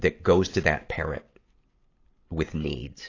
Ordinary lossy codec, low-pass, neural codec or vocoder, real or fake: AAC, 32 kbps; 7.2 kHz; none; real